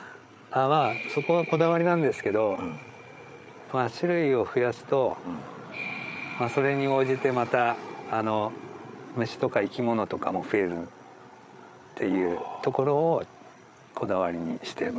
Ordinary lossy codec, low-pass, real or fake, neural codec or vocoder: none; none; fake; codec, 16 kHz, 8 kbps, FreqCodec, larger model